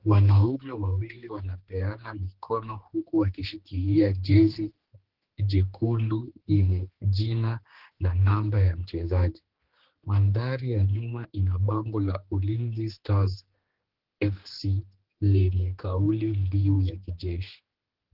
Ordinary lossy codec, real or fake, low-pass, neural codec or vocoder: Opus, 16 kbps; fake; 5.4 kHz; codec, 16 kHz, 2 kbps, X-Codec, HuBERT features, trained on general audio